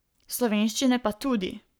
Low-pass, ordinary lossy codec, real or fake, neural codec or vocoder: none; none; fake; codec, 44.1 kHz, 7.8 kbps, Pupu-Codec